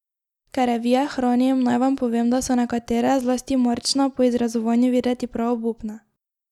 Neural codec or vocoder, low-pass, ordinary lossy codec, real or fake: none; 19.8 kHz; none; real